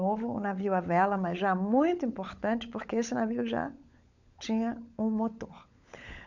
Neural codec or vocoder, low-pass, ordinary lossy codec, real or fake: codec, 16 kHz, 16 kbps, FunCodec, trained on LibriTTS, 50 frames a second; 7.2 kHz; none; fake